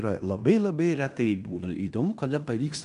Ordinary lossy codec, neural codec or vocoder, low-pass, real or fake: MP3, 96 kbps; codec, 16 kHz in and 24 kHz out, 0.9 kbps, LongCat-Audio-Codec, fine tuned four codebook decoder; 10.8 kHz; fake